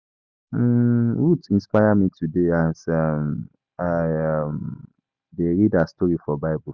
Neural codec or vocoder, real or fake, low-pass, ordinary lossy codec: none; real; 7.2 kHz; none